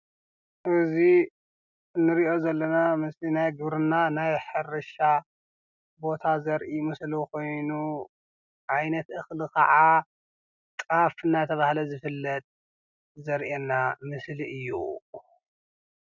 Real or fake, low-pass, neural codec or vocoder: real; 7.2 kHz; none